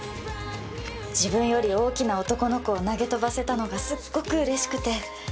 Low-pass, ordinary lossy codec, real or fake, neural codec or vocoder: none; none; real; none